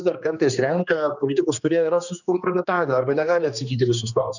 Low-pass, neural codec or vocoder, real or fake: 7.2 kHz; codec, 16 kHz, 2 kbps, X-Codec, HuBERT features, trained on general audio; fake